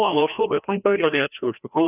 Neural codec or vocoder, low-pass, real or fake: codec, 16 kHz, 1 kbps, FreqCodec, larger model; 3.6 kHz; fake